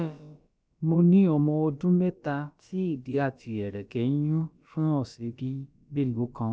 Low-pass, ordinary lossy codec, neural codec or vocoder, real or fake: none; none; codec, 16 kHz, about 1 kbps, DyCAST, with the encoder's durations; fake